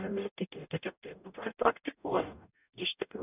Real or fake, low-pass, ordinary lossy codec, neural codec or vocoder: fake; 3.6 kHz; AAC, 32 kbps; codec, 44.1 kHz, 0.9 kbps, DAC